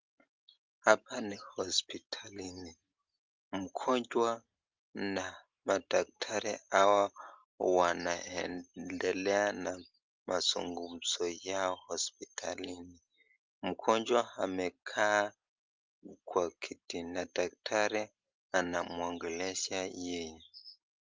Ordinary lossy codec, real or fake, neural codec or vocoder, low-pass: Opus, 24 kbps; real; none; 7.2 kHz